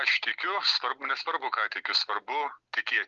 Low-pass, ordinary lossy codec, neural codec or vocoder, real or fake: 7.2 kHz; Opus, 32 kbps; none; real